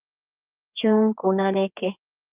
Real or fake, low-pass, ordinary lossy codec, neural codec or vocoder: fake; 3.6 kHz; Opus, 32 kbps; codec, 16 kHz, 4 kbps, X-Codec, HuBERT features, trained on general audio